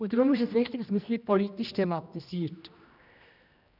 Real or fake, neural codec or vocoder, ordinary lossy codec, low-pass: fake; codec, 16 kHz, 1 kbps, X-Codec, HuBERT features, trained on general audio; none; 5.4 kHz